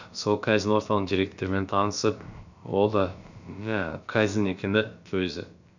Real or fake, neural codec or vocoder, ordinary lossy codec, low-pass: fake; codec, 16 kHz, about 1 kbps, DyCAST, with the encoder's durations; none; 7.2 kHz